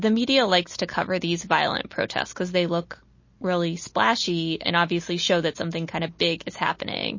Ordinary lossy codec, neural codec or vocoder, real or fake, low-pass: MP3, 32 kbps; none; real; 7.2 kHz